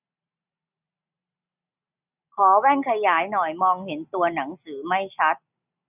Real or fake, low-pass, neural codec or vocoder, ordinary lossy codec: real; 3.6 kHz; none; none